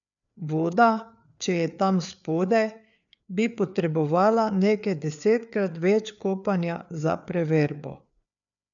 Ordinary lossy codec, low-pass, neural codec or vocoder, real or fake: none; 7.2 kHz; codec, 16 kHz, 4 kbps, FreqCodec, larger model; fake